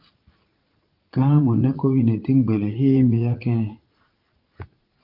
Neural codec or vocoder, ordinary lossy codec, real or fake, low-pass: vocoder, 44.1 kHz, 80 mel bands, Vocos; Opus, 32 kbps; fake; 5.4 kHz